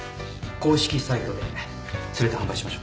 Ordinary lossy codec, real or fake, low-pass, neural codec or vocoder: none; real; none; none